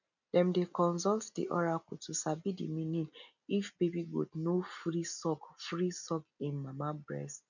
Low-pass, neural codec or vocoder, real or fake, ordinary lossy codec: 7.2 kHz; none; real; none